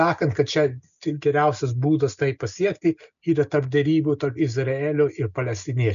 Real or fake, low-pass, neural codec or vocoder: real; 7.2 kHz; none